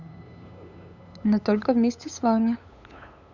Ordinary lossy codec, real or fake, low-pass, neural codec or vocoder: none; fake; 7.2 kHz; codec, 16 kHz, 8 kbps, FunCodec, trained on LibriTTS, 25 frames a second